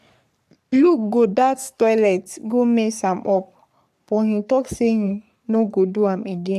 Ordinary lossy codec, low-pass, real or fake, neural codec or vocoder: none; 14.4 kHz; fake; codec, 44.1 kHz, 3.4 kbps, Pupu-Codec